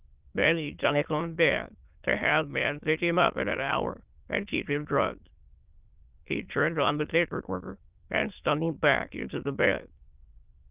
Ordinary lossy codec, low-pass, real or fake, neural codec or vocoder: Opus, 32 kbps; 3.6 kHz; fake; autoencoder, 22.05 kHz, a latent of 192 numbers a frame, VITS, trained on many speakers